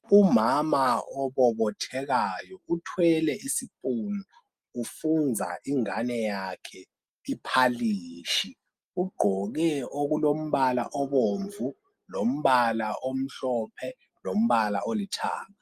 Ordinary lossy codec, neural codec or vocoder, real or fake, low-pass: Opus, 32 kbps; none; real; 14.4 kHz